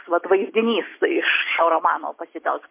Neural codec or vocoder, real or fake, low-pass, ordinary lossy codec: none; real; 3.6 kHz; MP3, 24 kbps